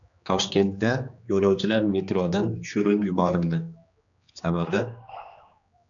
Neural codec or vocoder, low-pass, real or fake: codec, 16 kHz, 2 kbps, X-Codec, HuBERT features, trained on general audio; 7.2 kHz; fake